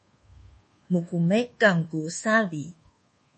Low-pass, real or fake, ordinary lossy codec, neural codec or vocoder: 10.8 kHz; fake; MP3, 32 kbps; codec, 24 kHz, 1.2 kbps, DualCodec